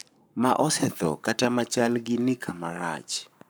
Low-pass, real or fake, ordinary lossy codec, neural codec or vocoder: none; fake; none; codec, 44.1 kHz, 7.8 kbps, Pupu-Codec